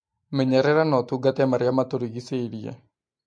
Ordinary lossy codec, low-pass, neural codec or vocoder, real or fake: MP3, 48 kbps; 9.9 kHz; none; real